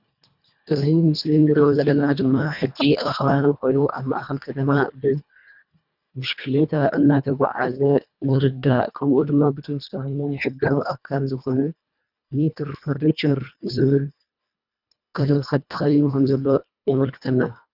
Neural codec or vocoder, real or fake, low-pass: codec, 24 kHz, 1.5 kbps, HILCodec; fake; 5.4 kHz